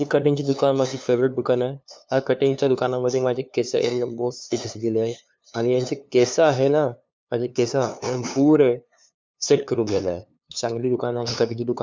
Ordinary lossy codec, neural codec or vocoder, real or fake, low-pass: none; codec, 16 kHz, 2 kbps, FunCodec, trained on LibriTTS, 25 frames a second; fake; none